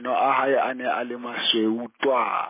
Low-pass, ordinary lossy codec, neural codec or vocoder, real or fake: 3.6 kHz; MP3, 16 kbps; none; real